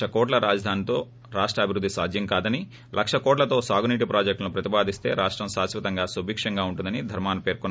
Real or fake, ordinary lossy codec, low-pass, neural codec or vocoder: real; none; none; none